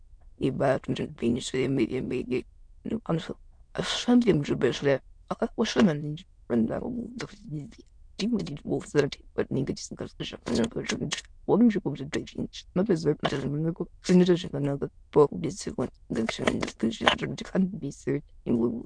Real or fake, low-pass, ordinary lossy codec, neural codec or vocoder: fake; 9.9 kHz; MP3, 64 kbps; autoencoder, 22.05 kHz, a latent of 192 numbers a frame, VITS, trained on many speakers